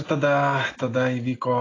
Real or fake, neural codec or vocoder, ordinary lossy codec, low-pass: real; none; AAC, 32 kbps; 7.2 kHz